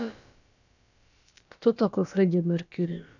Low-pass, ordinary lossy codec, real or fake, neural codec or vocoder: 7.2 kHz; none; fake; codec, 16 kHz, about 1 kbps, DyCAST, with the encoder's durations